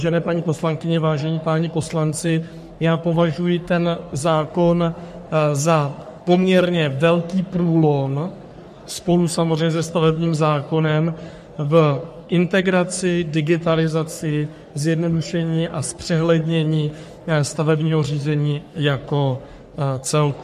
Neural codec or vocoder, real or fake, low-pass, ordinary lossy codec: codec, 44.1 kHz, 3.4 kbps, Pupu-Codec; fake; 14.4 kHz; MP3, 64 kbps